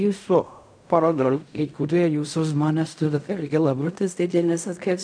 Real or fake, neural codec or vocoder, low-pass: fake; codec, 16 kHz in and 24 kHz out, 0.4 kbps, LongCat-Audio-Codec, fine tuned four codebook decoder; 9.9 kHz